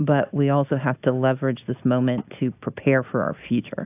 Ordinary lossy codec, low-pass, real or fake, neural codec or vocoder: AAC, 32 kbps; 3.6 kHz; real; none